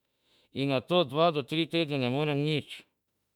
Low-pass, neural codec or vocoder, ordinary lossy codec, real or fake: 19.8 kHz; autoencoder, 48 kHz, 32 numbers a frame, DAC-VAE, trained on Japanese speech; none; fake